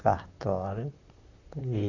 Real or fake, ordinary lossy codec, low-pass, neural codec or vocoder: fake; none; 7.2 kHz; vocoder, 44.1 kHz, 128 mel bands, Pupu-Vocoder